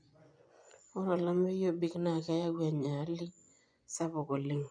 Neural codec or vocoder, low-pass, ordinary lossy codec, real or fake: vocoder, 24 kHz, 100 mel bands, Vocos; 9.9 kHz; none; fake